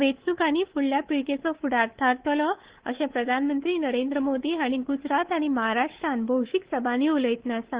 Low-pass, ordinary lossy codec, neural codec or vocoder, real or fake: 3.6 kHz; Opus, 16 kbps; codec, 24 kHz, 3.1 kbps, DualCodec; fake